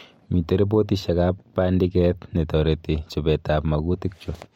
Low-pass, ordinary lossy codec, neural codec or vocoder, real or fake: 19.8 kHz; MP3, 64 kbps; none; real